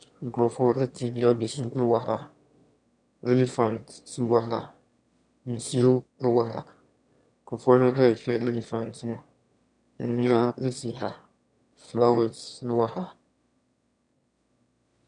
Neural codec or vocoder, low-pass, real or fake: autoencoder, 22.05 kHz, a latent of 192 numbers a frame, VITS, trained on one speaker; 9.9 kHz; fake